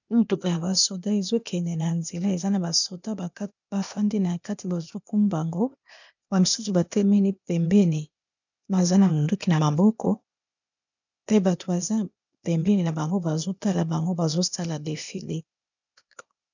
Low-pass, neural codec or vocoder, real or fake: 7.2 kHz; codec, 16 kHz, 0.8 kbps, ZipCodec; fake